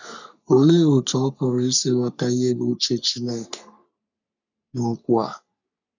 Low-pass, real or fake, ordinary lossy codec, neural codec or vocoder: 7.2 kHz; fake; none; codec, 44.1 kHz, 3.4 kbps, Pupu-Codec